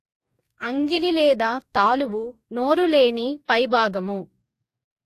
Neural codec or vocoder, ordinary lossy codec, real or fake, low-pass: codec, 44.1 kHz, 2.6 kbps, DAC; AAC, 64 kbps; fake; 14.4 kHz